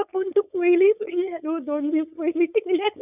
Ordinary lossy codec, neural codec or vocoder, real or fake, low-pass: none; codec, 16 kHz, 4.8 kbps, FACodec; fake; 3.6 kHz